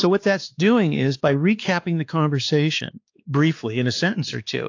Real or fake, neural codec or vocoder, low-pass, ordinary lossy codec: fake; codec, 16 kHz, 2 kbps, X-Codec, WavLM features, trained on Multilingual LibriSpeech; 7.2 kHz; AAC, 48 kbps